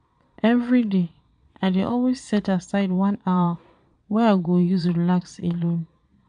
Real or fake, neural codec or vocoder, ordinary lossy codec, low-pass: fake; vocoder, 22.05 kHz, 80 mel bands, Vocos; none; 9.9 kHz